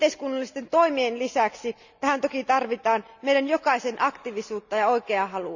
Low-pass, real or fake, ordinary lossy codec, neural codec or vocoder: 7.2 kHz; real; none; none